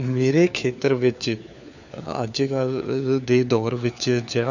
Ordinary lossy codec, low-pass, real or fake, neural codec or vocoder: none; 7.2 kHz; fake; codec, 16 kHz, 4 kbps, FunCodec, trained on LibriTTS, 50 frames a second